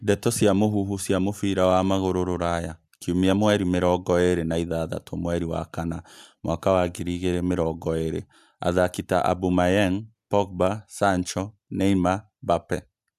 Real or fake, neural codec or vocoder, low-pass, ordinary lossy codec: real; none; 14.4 kHz; AAC, 96 kbps